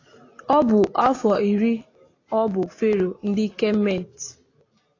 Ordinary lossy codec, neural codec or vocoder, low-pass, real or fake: AAC, 32 kbps; none; 7.2 kHz; real